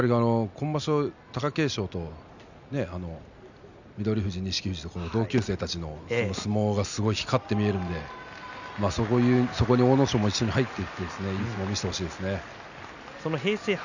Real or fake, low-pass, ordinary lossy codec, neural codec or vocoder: real; 7.2 kHz; none; none